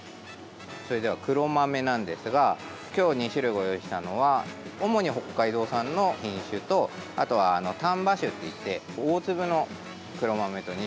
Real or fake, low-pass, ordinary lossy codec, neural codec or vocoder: real; none; none; none